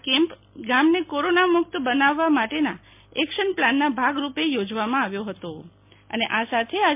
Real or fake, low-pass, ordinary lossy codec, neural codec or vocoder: real; 3.6 kHz; MP3, 32 kbps; none